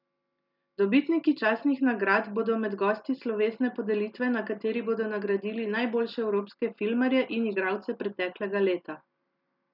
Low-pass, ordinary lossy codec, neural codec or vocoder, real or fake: 5.4 kHz; none; none; real